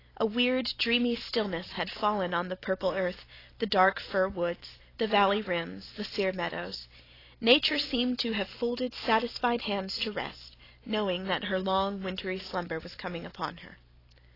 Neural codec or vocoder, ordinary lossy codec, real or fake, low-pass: none; AAC, 24 kbps; real; 5.4 kHz